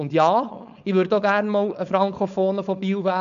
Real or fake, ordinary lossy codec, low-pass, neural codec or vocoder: fake; none; 7.2 kHz; codec, 16 kHz, 4.8 kbps, FACodec